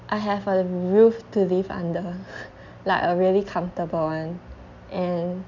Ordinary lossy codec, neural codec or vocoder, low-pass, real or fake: none; none; 7.2 kHz; real